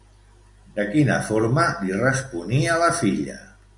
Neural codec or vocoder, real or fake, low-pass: none; real; 10.8 kHz